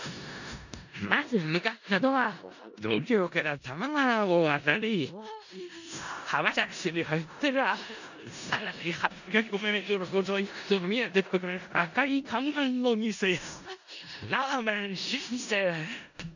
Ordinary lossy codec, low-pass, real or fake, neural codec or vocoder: none; 7.2 kHz; fake; codec, 16 kHz in and 24 kHz out, 0.4 kbps, LongCat-Audio-Codec, four codebook decoder